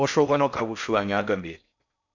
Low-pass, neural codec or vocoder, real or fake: 7.2 kHz; codec, 16 kHz in and 24 kHz out, 0.6 kbps, FocalCodec, streaming, 4096 codes; fake